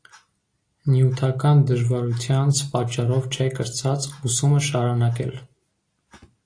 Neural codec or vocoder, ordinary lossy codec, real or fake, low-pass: none; AAC, 48 kbps; real; 9.9 kHz